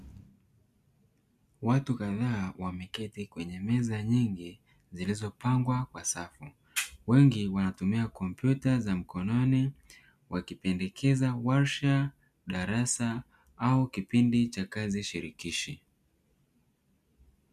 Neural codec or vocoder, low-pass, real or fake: none; 14.4 kHz; real